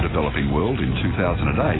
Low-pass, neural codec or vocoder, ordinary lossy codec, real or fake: 7.2 kHz; none; AAC, 16 kbps; real